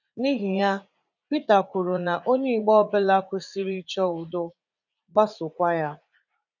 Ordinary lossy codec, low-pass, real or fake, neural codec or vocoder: none; 7.2 kHz; fake; vocoder, 44.1 kHz, 80 mel bands, Vocos